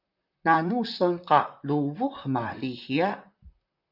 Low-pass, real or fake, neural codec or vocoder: 5.4 kHz; fake; vocoder, 44.1 kHz, 128 mel bands, Pupu-Vocoder